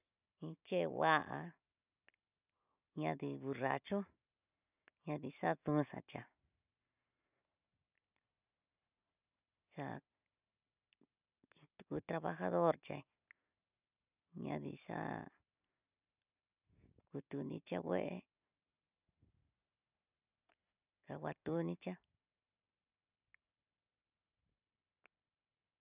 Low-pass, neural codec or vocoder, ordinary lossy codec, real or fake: 3.6 kHz; none; none; real